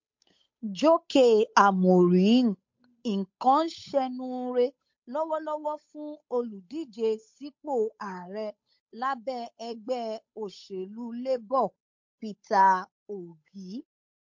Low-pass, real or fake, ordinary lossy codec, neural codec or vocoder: 7.2 kHz; fake; MP3, 48 kbps; codec, 16 kHz, 8 kbps, FunCodec, trained on Chinese and English, 25 frames a second